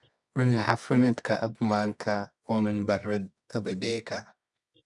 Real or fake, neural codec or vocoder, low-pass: fake; codec, 24 kHz, 0.9 kbps, WavTokenizer, medium music audio release; 10.8 kHz